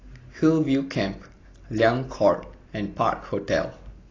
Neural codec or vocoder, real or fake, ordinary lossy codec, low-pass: none; real; AAC, 32 kbps; 7.2 kHz